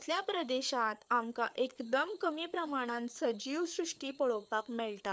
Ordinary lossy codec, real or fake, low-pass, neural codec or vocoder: none; fake; none; codec, 16 kHz, 4 kbps, FreqCodec, larger model